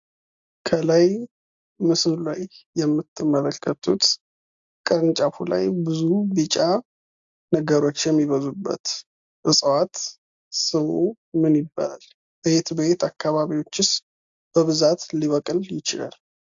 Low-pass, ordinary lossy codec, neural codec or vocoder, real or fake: 7.2 kHz; AAC, 64 kbps; none; real